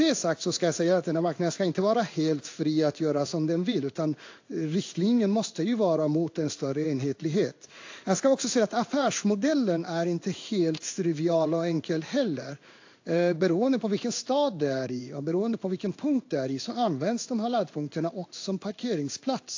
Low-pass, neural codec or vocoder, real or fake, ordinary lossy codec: 7.2 kHz; codec, 16 kHz in and 24 kHz out, 1 kbps, XY-Tokenizer; fake; AAC, 48 kbps